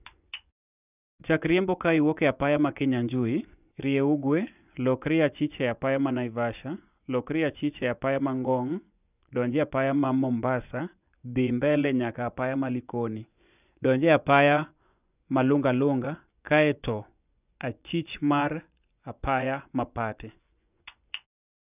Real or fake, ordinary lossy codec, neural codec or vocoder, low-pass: fake; none; vocoder, 24 kHz, 100 mel bands, Vocos; 3.6 kHz